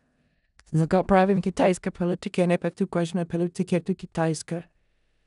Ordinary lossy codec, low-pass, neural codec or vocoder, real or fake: none; 10.8 kHz; codec, 16 kHz in and 24 kHz out, 0.4 kbps, LongCat-Audio-Codec, four codebook decoder; fake